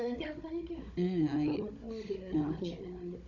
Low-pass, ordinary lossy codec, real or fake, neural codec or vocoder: 7.2 kHz; none; fake; codec, 16 kHz, 16 kbps, FunCodec, trained on Chinese and English, 50 frames a second